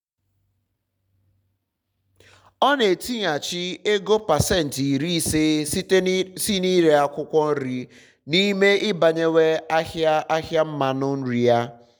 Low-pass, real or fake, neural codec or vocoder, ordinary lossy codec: none; real; none; none